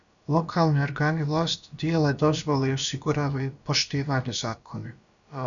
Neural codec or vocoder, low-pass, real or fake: codec, 16 kHz, about 1 kbps, DyCAST, with the encoder's durations; 7.2 kHz; fake